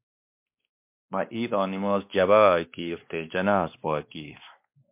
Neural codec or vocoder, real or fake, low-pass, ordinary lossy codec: codec, 16 kHz, 2 kbps, X-Codec, WavLM features, trained on Multilingual LibriSpeech; fake; 3.6 kHz; MP3, 32 kbps